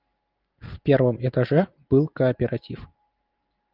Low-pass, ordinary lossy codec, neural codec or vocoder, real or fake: 5.4 kHz; Opus, 24 kbps; none; real